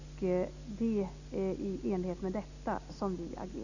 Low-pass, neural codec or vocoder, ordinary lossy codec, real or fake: 7.2 kHz; none; none; real